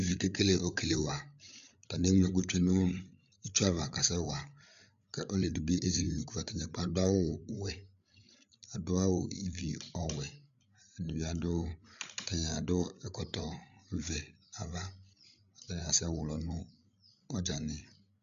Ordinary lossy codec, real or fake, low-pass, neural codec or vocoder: AAC, 96 kbps; fake; 7.2 kHz; codec, 16 kHz, 8 kbps, FreqCodec, larger model